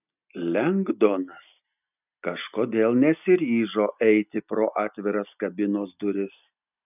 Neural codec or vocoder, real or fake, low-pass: none; real; 3.6 kHz